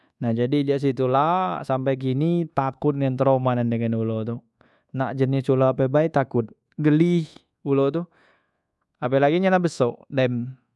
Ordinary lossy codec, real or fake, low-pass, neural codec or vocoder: none; fake; none; codec, 24 kHz, 1.2 kbps, DualCodec